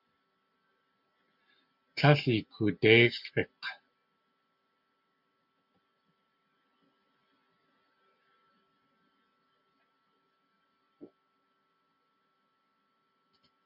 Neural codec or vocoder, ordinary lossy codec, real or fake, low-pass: none; MP3, 48 kbps; real; 5.4 kHz